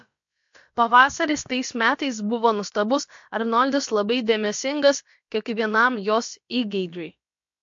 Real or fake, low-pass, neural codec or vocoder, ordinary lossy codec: fake; 7.2 kHz; codec, 16 kHz, about 1 kbps, DyCAST, with the encoder's durations; MP3, 48 kbps